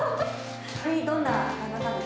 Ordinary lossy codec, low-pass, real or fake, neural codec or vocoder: none; none; real; none